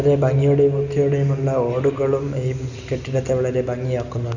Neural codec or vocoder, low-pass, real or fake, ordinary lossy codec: none; 7.2 kHz; real; none